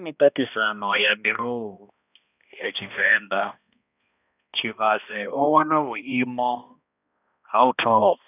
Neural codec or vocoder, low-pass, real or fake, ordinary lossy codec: codec, 16 kHz, 1 kbps, X-Codec, HuBERT features, trained on balanced general audio; 3.6 kHz; fake; none